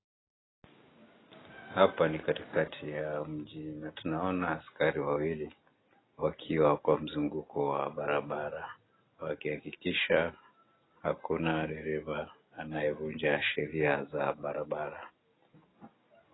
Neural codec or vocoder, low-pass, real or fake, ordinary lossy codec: none; 7.2 kHz; real; AAC, 16 kbps